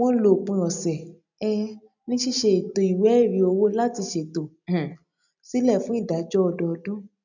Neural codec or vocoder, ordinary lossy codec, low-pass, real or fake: none; none; 7.2 kHz; real